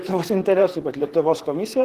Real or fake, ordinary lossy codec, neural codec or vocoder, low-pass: fake; Opus, 16 kbps; vocoder, 44.1 kHz, 128 mel bands, Pupu-Vocoder; 14.4 kHz